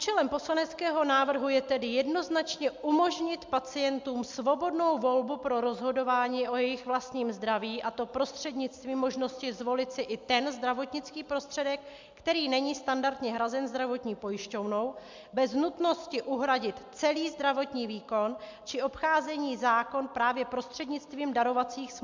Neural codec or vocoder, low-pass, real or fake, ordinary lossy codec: none; 7.2 kHz; real; MP3, 64 kbps